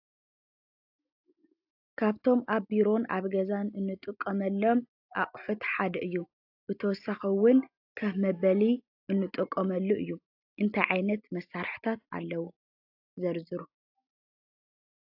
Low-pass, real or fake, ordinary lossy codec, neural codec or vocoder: 5.4 kHz; real; AAC, 48 kbps; none